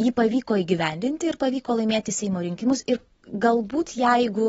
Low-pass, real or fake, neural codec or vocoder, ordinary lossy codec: 19.8 kHz; real; none; AAC, 24 kbps